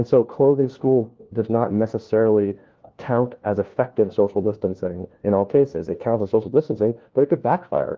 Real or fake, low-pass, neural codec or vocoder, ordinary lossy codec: fake; 7.2 kHz; codec, 16 kHz, 1 kbps, FunCodec, trained on LibriTTS, 50 frames a second; Opus, 16 kbps